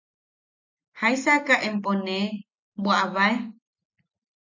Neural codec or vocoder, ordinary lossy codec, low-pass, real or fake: none; MP3, 64 kbps; 7.2 kHz; real